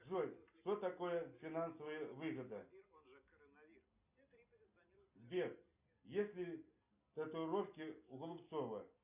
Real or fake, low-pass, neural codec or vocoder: real; 3.6 kHz; none